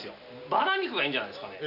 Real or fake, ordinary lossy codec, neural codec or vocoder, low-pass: real; AAC, 48 kbps; none; 5.4 kHz